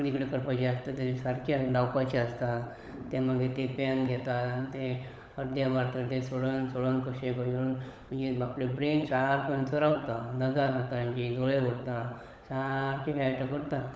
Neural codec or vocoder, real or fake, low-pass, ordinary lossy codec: codec, 16 kHz, 16 kbps, FunCodec, trained on LibriTTS, 50 frames a second; fake; none; none